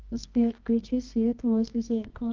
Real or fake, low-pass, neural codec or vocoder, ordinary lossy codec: fake; 7.2 kHz; codec, 24 kHz, 0.9 kbps, WavTokenizer, medium music audio release; Opus, 32 kbps